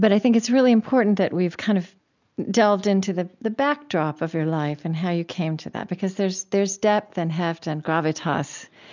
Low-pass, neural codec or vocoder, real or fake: 7.2 kHz; none; real